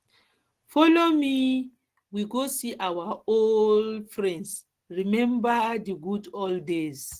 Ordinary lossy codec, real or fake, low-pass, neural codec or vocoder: Opus, 16 kbps; real; 14.4 kHz; none